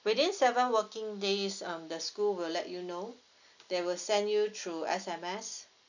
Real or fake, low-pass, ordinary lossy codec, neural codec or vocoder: real; 7.2 kHz; none; none